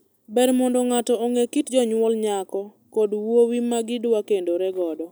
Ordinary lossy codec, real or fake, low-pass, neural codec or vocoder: none; real; none; none